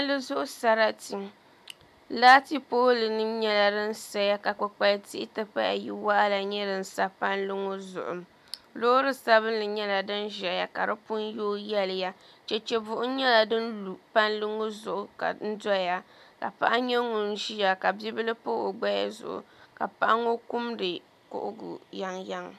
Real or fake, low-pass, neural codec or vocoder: real; 14.4 kHz; none